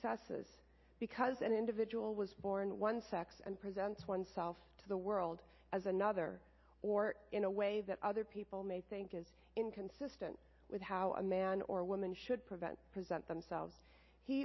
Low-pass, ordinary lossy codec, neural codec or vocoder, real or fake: 7.2 kHz; MP3, 24 kbps; none; real